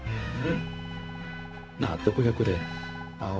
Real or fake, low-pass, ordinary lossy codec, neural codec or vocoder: fake; none; none; codec, 16 kHz, 0.4 kbps, LongCat-Audio-Codec